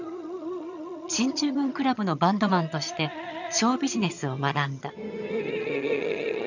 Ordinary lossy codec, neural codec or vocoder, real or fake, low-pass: none; vocoder, 22.05 kHz, 80 mel bands, HiFi-GAN; fake; 7.2 kHz